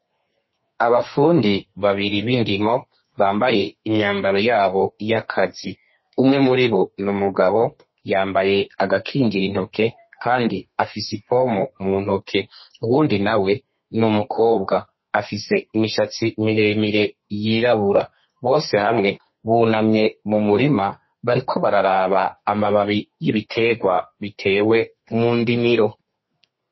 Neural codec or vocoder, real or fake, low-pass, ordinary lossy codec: codec, 32 kHz, 1.9 kbps, SNAC; fake; 7.2 kHz; MP3, 24 kbps